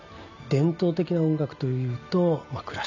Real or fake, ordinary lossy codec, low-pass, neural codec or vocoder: real; none; 7.2 kHz; none